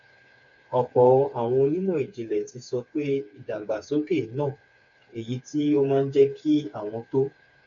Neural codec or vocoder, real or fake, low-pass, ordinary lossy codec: codec, 16 kHz, 4 kbps, FreqCodec, smaller model; fake; 7.2 kHz; none